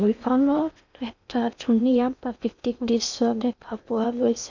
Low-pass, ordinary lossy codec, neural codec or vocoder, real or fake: 7.2 kHz; none; codec, 16 kHz in and 24 kHz out, 0.8 kbps, FocalCodec, streaming, 65536 codes; fake